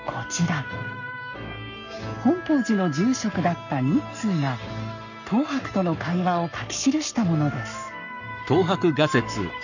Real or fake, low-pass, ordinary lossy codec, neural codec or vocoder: fake; 7.2 kHz; none; codec, 44.1 kHz, 7.8 kbps, Pupu-Codec